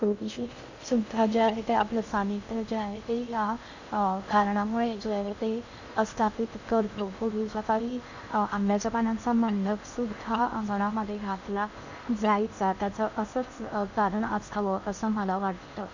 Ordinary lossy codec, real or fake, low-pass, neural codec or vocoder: Opus, 64 kbps; fake; 7.2 kHz; codec, 16 kHz in and 24 kHz out, 0.6 kbps, FocalCodec, streaming, 2048 codes